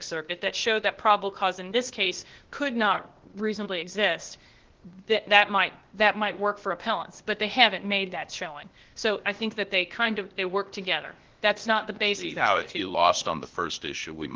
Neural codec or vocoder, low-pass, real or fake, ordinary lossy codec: codec, 16 kHz, 0.8 kbps, ZipCodec; 7.2 kHz; fake; Opus, 16 kbps